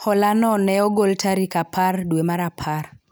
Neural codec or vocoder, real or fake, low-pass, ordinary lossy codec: none; real; none; none